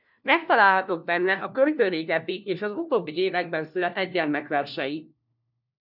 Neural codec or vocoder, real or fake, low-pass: codec, 16 kHz, 1 kbps, FunCodec, trained on LibriTTS, 50 frames a second; fake; 5.4 kHz